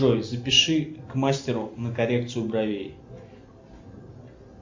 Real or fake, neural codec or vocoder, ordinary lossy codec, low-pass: real; none; MP3, 48 kbps; 7.2 kHz